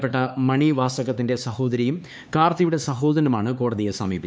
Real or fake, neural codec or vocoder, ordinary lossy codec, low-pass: fake; codec, 16 kHz, 4 kbps, X-Codec, HuBERT features, trained on LibriSpeech; none; none